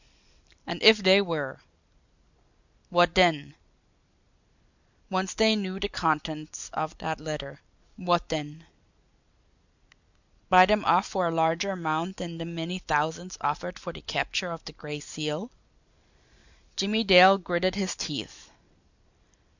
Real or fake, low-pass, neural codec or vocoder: real; 7.2 kHz; none